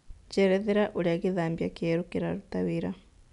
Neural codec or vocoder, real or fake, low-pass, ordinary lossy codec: none; real; 10.8 kHz; none